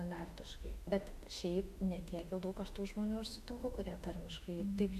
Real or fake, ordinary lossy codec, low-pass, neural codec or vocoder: fake; AAC, 96 kbps; 14.4 kHz; autoencoder, 48 kHz, 32 numbers a frame, DAC-VAE, trained on Japanese speech